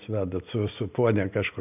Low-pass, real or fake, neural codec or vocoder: 3.6 kHz; real; none